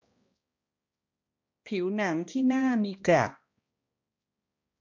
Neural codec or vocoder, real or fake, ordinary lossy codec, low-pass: codec, 16 kHz, 1 kbps, X-Codec, HuBERT features, trained on balanced general audio; fake; MP3, 48 kbps; 7.2 kHz